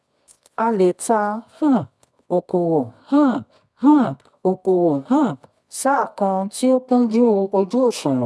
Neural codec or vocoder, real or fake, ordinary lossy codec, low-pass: codec, 24 kHz, 0.9 kbps, WavTokenizer, medium music audio release; fake; none; none